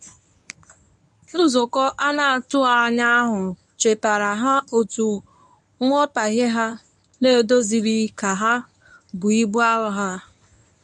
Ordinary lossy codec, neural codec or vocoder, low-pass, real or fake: none; codec, 24 kHz, 0.9 kbps, WavTokenizer, medium speech release version 1; none; fake